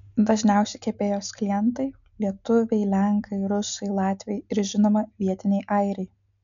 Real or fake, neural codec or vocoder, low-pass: real; none; 7.2 kHz